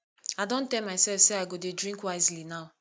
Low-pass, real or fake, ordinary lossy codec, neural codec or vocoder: none; real; none; none